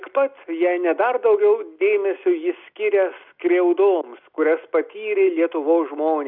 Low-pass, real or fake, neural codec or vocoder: 5.4 kHz; real; none